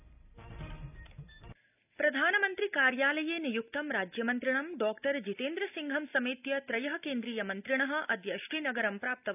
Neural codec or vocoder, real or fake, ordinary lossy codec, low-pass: none; real; none; 3.6 kHz